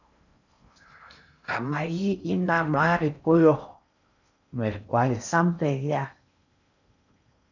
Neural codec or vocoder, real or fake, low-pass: codec, 16 kHz in and 24 kHz out, 0.6 kbps, FocalCodec, streaming, 4096 codes; fake; 7.2 kHz